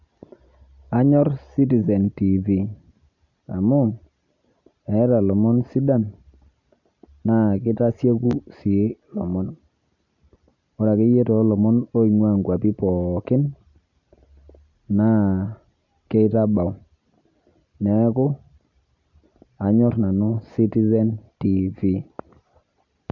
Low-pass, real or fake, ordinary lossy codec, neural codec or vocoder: 7.2 kHz; real; none; none